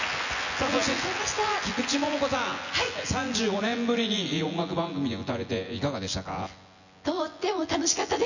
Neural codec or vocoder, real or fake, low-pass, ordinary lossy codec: vocoder, 24 kHz, 100 mel bands, Vocos; fake; 7.2 kHz; none